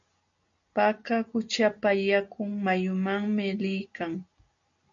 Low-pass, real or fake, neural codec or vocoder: 7.2 kHz; real; none